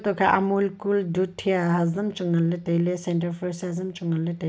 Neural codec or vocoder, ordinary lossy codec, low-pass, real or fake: none; none; none; real